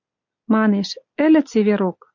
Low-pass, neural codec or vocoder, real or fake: 7.2 kHz; none; real